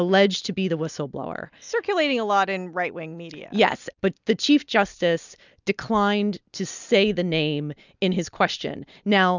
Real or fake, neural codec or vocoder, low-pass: real; none; 7.2 kHz